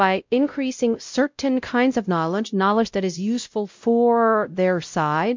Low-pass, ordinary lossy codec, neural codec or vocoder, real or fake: 7.2 kHz; MP3, 64 kbps; codec, 16 kHz, 0.5 kbps, X-Codec, WavLM features, trained on Multilingual LibriSpeech; fake